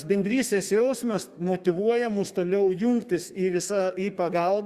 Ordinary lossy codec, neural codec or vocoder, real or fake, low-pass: Opus, 64 kbps; codec, 32 kHz, 1.9 kbps, SNAC; fake; 14.4 kHz